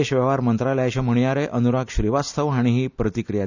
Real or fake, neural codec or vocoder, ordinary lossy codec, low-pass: real; none; none; 7.2 kHz